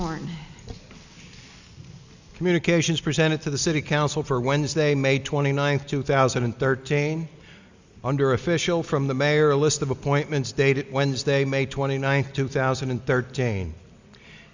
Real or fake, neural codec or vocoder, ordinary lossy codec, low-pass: real; none; Opus, 64 kbps; 7.2 kHz